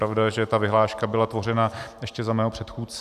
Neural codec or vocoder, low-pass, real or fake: none; 14.4 kHz; real